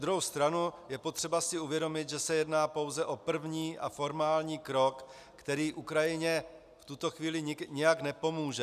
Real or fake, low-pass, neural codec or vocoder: real; 14.4 kHz; none